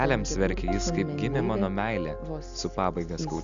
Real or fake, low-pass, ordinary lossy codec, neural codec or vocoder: real; 7.2 kHz; Opus, 64 kbps; none